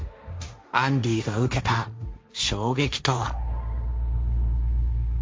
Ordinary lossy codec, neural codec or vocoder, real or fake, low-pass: none; codec, 16 kHz, 1.1 kbps, Voila-Tokenizer; fake; none